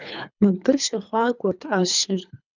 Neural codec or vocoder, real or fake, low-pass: codec, 24 kHz, 3 kbps, HILCodec; fake; 7.2 kHz